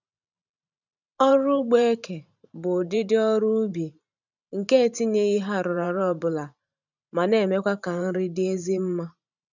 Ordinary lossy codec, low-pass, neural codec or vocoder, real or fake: none; 7.2 kHz; vocoder, 44.1 kHz, 128 mel bands every 512 samples, BigVGAN v2; fake